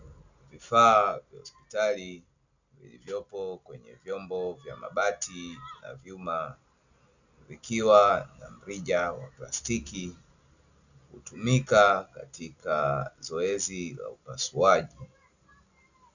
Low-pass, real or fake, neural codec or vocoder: 7.2 kHz; real; none